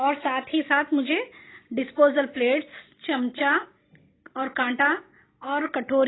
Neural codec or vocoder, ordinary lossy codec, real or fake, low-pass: none; AAC, 16 kbps; real; 7.2 kHz